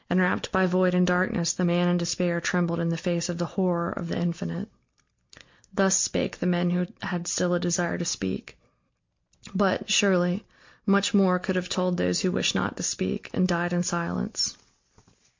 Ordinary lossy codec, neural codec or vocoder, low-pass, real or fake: MP3, 48 kbps; none; 7.2 kHz; real